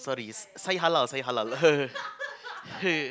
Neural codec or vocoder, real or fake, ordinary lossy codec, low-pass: none; real; none; none